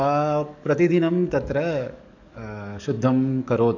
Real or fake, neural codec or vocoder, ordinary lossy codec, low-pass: fake; codec, 44.1 kHz, 7.8 kbps, DAC; none; 7.2 kHz